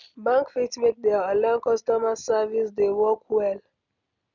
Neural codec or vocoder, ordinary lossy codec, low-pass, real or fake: none; none; 7.2 kHz; real